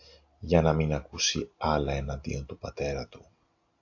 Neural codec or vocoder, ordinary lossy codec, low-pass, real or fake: none; AAC, 48 kbps; 7.2 kHz; real